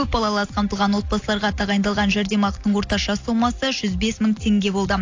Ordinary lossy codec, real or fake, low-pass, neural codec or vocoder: AAC, 48 kbps; real; 7.2 kHz; none